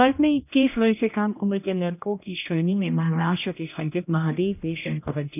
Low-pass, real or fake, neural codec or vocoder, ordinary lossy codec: 3.6 kHz; fake; codec, 16 kHz, 1 kbps, X-Codec, HuBERT features, trained on general audio; none